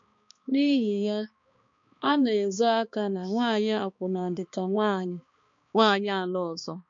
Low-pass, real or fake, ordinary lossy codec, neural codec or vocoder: 7.2 kHz; fake; MP3, 48 kbps; codec, 16 kHz, 2 kbps, X-Codec, HuBERT features, trained on balanced general audio